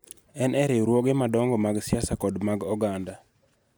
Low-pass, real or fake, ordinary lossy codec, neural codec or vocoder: none; real; none; none